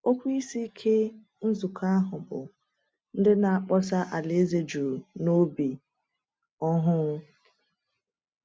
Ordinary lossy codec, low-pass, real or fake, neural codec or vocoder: none; none; real; none